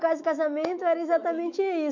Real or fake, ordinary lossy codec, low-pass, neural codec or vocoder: fake; none; 7.2 kHz; autoencoder, 48 kHz, 128 numbers a frame, DAC-VAE, trained on Japanese speech